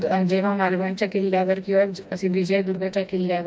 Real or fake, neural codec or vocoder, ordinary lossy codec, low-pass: fake; codec, 16 kHz, 1 kbps, FreqCodec, smaller model; none; none